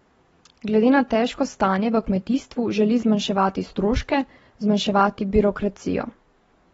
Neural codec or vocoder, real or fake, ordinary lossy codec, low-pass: none; real; AAC, 24 kbps; 19.8 kHz